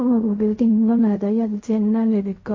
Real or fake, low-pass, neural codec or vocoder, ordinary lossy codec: fake; 7.2 kHz; codec, 16 kHz in and 24 kHz out, 0.4 kbps, LongCat-Audio-Codec, fine tuned four codebook decoder; MP3, 32 kbps